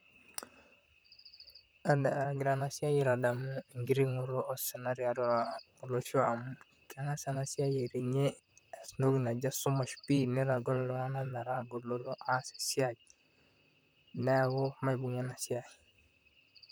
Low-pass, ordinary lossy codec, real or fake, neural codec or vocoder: none; none; fake; vocoder, 44.1 kHz, 128 mel bands, Pupu-Vocoder